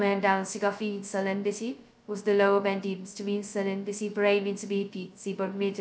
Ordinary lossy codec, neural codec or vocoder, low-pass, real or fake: none; codec, 16 kHz, 0.2 kbps, FocalCodec; none; fake